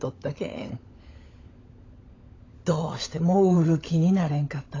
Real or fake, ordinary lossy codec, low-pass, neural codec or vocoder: fake; AAC, 32 kbps; 7.2 kHz; codec, 16 kHz, 16 kbps, FunCodec, trained on LibriTTS, 50 frames a second